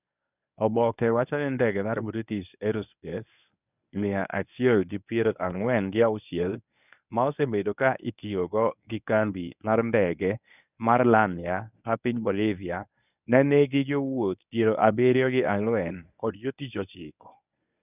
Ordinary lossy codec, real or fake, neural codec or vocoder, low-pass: none; fake; codec, 24 kHz, 0.9 kbps, WavTokenizer, medium speech release version 1; 3.6 kHz